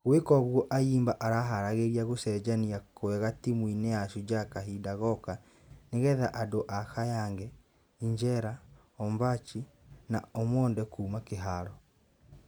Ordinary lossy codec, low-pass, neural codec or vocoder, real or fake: none; none; none; real